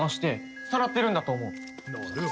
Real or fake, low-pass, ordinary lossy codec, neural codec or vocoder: real; none; none; none